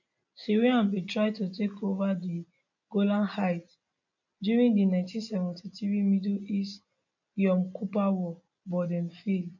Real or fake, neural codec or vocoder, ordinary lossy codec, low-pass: real; none; none; 7.2 kHz